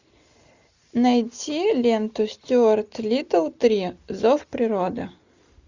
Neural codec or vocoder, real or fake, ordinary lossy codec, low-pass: none; real; Opus, 64 kbps; 7.2 kHz